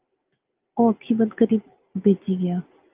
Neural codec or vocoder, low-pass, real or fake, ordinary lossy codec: none; 3.6 kHz; real; Opus, 32 kbps